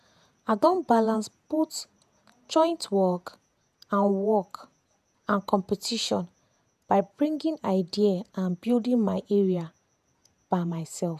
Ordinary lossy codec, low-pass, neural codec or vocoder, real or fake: none; 14.4 kHz; vocoder, 48 kHz, 128 mel bands, Vocos; fake